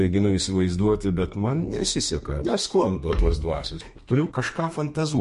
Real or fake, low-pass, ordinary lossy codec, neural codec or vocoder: fake; 14.4 kHz; MP3, 48 kbps; codec, 32 kHz, 1.9 kbps, SNAC